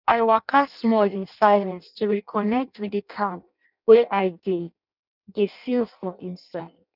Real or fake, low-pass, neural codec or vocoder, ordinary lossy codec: fake; 5.4 kHz; codec, 16 kHz in and 24 kHz out, 0.6 kbps, FireRedTTS-2 codec; none